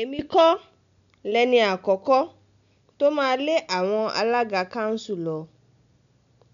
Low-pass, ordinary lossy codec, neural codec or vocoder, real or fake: 7.2 kHz; none; none; real